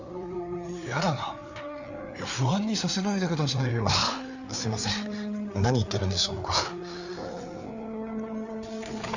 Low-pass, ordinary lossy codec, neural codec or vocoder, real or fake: 7.2 kHz; none; codec, 16 kHz, 4 kbps, FreqCodec, larger model; fake